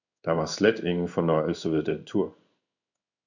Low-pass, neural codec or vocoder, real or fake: 7.2 kHz; codec, 16 kHz in and 24 kHz out, 1 kbps, XY-Tokenizer; fake